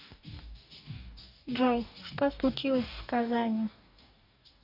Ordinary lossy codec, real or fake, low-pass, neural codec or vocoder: none; fake; 5.4 kHz; codec, 44.1 kHz, 2.6 kbps, DAC